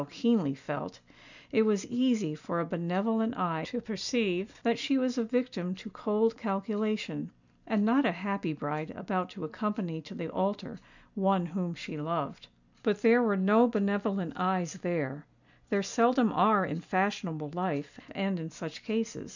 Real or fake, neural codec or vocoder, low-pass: real; none; 7.2 kHz